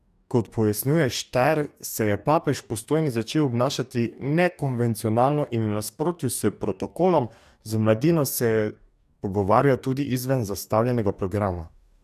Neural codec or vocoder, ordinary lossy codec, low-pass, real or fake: codec, 44.1 kHz, 2.6 kbps, DAC; none; 14.4 kHz; fake